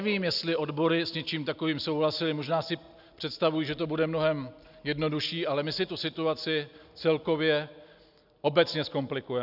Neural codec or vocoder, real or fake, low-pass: none; real; 5.4 kHz